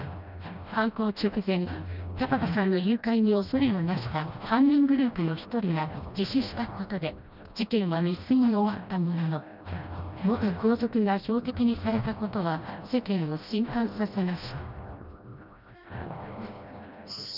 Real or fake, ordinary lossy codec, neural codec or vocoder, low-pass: fake; none; codec, 16 kHz, 1 kbps, FreqCodec, smaller model; 5.4 kHz